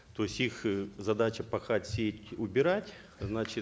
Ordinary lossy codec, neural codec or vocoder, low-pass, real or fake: none; none; none; real